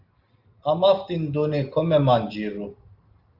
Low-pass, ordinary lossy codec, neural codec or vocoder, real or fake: 5.4 kHz; Opus, 32 kbps; none; real